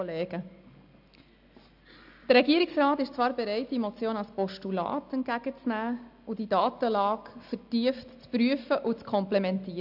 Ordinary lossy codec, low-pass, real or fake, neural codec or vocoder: AAC, 48 kbps; 5.4 kHz; real; none